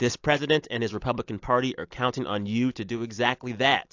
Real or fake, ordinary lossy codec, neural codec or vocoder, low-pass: real; AAC, 48 kbps; none; 7.2 kHz